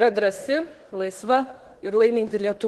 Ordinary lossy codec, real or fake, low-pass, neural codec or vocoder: Opus, 16 kbps; fake; 10.8 kHz; codec, 16 kHz in and 24 kHz out, 0.9 kbps, LongCat-Audio-Codec, fine tuned four codebook decoder